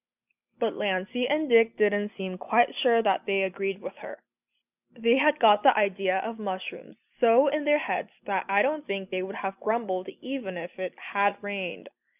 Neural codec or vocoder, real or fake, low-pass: none; real; 3.6 kHz